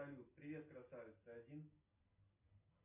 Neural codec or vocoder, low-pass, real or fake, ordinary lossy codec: none; 3.6 kHz; real; MP3, 24 kbps